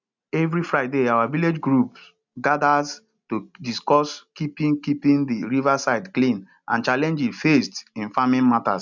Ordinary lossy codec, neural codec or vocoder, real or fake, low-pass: none; none; real; 7.2 kHz